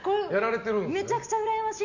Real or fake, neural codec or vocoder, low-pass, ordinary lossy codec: real; none; 7.2 kHz; none